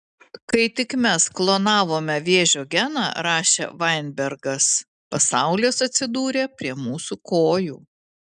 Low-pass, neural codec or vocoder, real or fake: 9.9 kHz; none; real